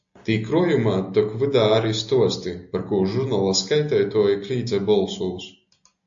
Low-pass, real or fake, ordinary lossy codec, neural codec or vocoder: 7.2 kHz; real; AAC, 64 kbps; none